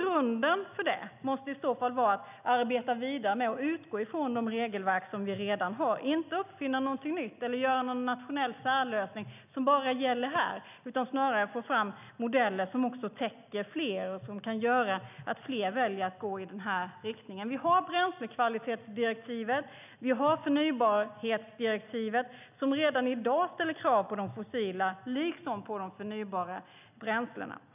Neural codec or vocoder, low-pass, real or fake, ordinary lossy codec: none; 3.6 kHz; real; none